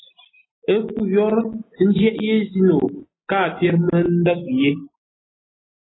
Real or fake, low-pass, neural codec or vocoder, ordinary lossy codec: real; 7.2 kHz; none; AAC, 16 kbps